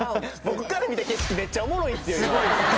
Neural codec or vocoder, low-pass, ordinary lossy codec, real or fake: none; none; none; real